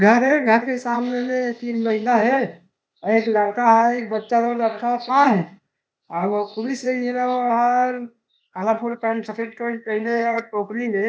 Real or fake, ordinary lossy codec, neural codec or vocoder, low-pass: fake; none; codec, 16 kHz, 0.8 kbps, ZipCodec; none